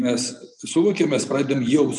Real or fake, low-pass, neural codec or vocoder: real; 10.8 kHz; none